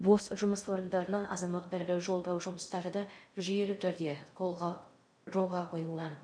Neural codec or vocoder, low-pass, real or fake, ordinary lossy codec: codec, 16 kHz in and 24 kHz out, 0.6 kbps, FocalCodec, streaming, 2048 codes; 9.9 kHz; fake; none